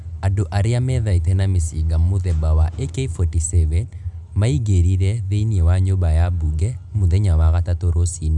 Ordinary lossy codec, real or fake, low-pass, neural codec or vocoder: none; real; 10.8 kHz; none